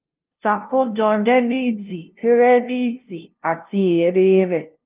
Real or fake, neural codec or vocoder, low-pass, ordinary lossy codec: fake; codec, 16 kHz, 0.5 kbps, FunCodec, trained on LibriTTS, 25 frames a second; 3.6 kHz; Opus, 32 kbps